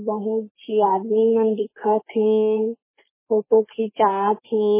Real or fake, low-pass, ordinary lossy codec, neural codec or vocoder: fake; 3.6 kHz; MP3, 16 kbps; codec, 44.1 kHz, 3.4 kbps, Pupu-Codec